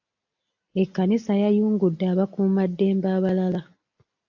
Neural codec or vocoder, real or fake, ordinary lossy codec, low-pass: none; real; AAC, 48 kbps; 7.2 kHz